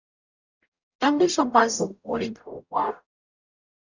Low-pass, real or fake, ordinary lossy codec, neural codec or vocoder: 7.2 kHz; fake; Opus, 64 kbps; codec, 44.1 kHz, 0.9 kbps, DAC